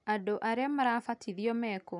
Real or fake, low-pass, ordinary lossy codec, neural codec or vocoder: real; 10.8 kHz; none; none